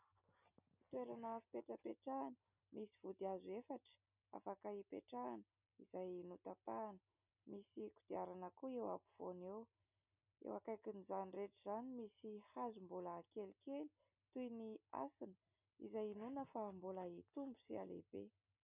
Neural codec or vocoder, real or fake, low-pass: none; real; 3.6 kHz